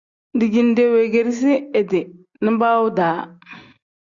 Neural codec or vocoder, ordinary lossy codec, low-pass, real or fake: none; Opus, 64 kbps; 7.2 kHz; real